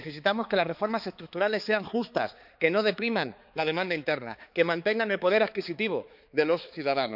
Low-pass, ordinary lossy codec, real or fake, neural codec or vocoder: 5.4 kHz; none; fake; codec, 16 kHz, 4 kbps, X-Codec, HuBERT features, trained on balanced general audio